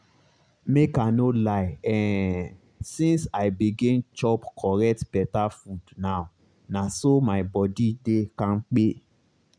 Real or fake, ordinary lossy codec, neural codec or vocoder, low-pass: real; none; none; 9.9 kHz